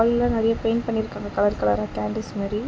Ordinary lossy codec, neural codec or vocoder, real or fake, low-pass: none; none; real; none